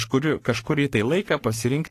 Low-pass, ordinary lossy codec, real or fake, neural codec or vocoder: 14.4 kHz; AAC, 48 kbps; fake; codec, 44.1 kHz, 3.4 kbps, Pupu-Codec